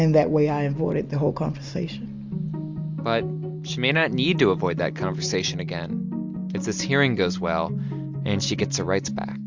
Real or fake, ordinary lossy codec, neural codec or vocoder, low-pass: real; MP3, 64 kbps; none; 7.2 kHz